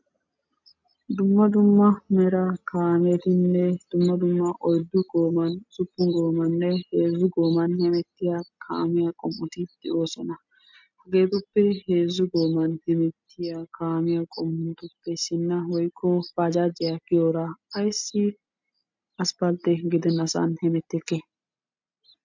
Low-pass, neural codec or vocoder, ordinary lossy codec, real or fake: 7.2 kHz; none; MP3, 64 kbps; real